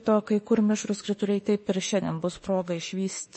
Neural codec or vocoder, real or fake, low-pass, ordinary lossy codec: autoencoder, 48 kHz, 32 numbers a frame, DAC-VAE, trained on Japanese speech; fake; 10.8 kHz; MP3, 32 kbps